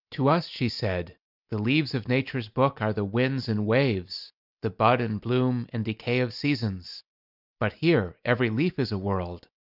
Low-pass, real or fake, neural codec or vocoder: 5.4 kHz; real; none